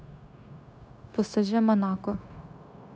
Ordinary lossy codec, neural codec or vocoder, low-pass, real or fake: none; codec, 16 kHz, 0.9 kbps, LongCat-Audio-Codec; none; fake